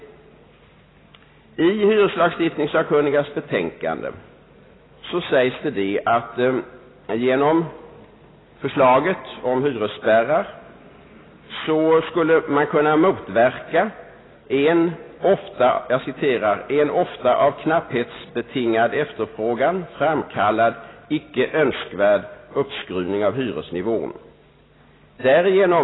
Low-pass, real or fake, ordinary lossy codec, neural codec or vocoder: 7.2 kHz; real; AAC, 16 kbps; none